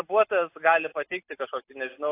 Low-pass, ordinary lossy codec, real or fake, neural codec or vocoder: 3.6 kHz; AAC, 24 kbps; real; none